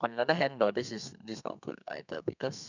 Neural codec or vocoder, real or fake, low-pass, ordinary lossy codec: codec, 32 kHz, 1.9 kbps, SNAC; fake; 7.2 kHz; none